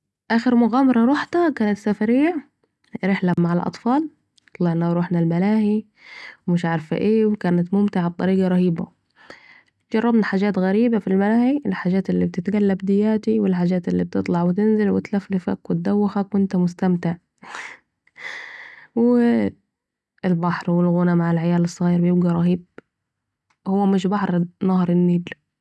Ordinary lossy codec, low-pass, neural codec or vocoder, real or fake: none; none; none; real